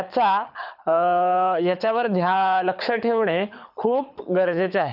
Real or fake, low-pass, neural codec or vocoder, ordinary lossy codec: fake; 5.4 kHz; codec, 24 kHz, 6 kbps, HILCodec; none